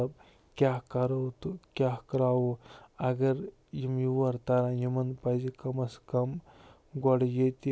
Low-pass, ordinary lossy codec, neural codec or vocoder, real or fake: none; none; none; real